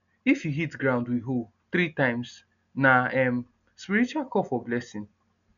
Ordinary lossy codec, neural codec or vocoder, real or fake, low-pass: none; none; real; 7.2 kHz